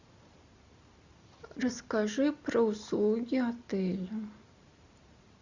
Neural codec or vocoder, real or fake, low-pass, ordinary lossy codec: none; real; 7.2 kHz; Opus, 64 kbps